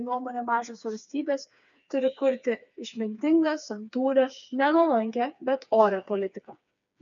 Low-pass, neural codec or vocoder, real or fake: 7.2 kHz; codec, 16 kHz, 4 kbps, FreqCodec, smaller model; fake